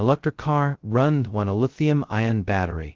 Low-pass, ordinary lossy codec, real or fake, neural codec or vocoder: 7.2 kHz; Opus, 16 kbps; fake; codec, 16 kHz, 0.2 kbps, FocalCodec